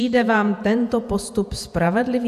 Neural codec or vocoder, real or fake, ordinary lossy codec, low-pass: vocoder, 48 kHz, 128 mel bands, Vocos; fake; AAC, 96 kbps; 14.4 kHz